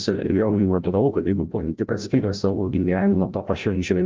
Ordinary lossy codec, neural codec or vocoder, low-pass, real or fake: Opus, 24 kbps; codec, 16 kHz, 0.5 kbps, FreqCodec, larger model; 7.2 kHz; fake